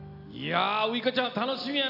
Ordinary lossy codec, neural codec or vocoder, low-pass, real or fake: AAC, 48 kbps; none; 5.4 kHz; real